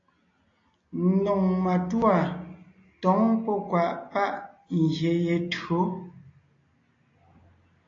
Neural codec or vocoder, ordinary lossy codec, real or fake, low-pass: none; AAC, 32 kbps; real; 7.2 kHz